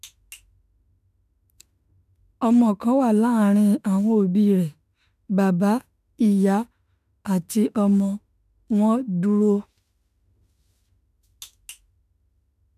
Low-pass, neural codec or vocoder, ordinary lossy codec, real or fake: 14.4 kHz; autoencoder, 48 kHz, 32 numbers a frame, DAC-VAE, trained on Japanese speech; none; fake